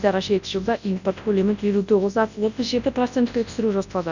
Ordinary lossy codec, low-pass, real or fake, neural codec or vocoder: none; 7.2 kHz; fake; codec, 24 kHz, 0.9 kbps, WavTokenizer, large speech release